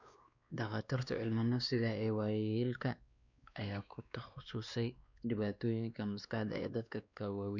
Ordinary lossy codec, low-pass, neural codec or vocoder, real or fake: none; 7.2 kHz; codec, 16 kHz, 2 kbps, X-Codec, WavLM features, trained on Multilingual LibriSpeech; fake